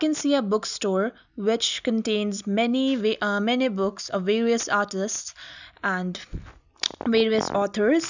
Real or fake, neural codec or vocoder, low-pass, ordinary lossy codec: real; none; 7.2 kHz; none